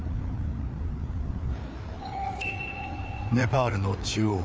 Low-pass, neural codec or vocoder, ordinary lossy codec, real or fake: none; codec, 16 kHz, 4 kbps, FreqCodec, larger model; none; fake